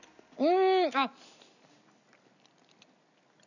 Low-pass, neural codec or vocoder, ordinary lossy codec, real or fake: 7.2 kHz; none; none; real